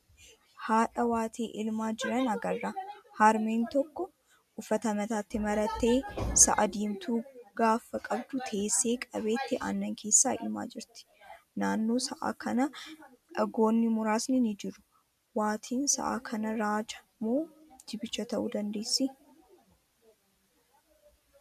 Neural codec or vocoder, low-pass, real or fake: none; 14.4 kHz; real